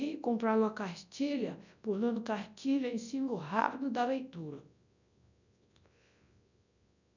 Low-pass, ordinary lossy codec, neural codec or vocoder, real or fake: 7.2 kHz; none; codec, 24 kHz, 0.9 kbps, WavTokenizer, large speech release; fake